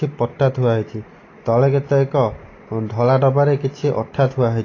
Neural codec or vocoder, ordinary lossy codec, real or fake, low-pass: none; AAC, 32 kbps; real; 7.2 kHz